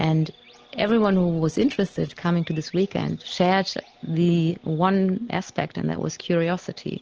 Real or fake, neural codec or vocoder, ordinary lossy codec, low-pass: real; none; Opus, 16 kbps; 7.2 kHz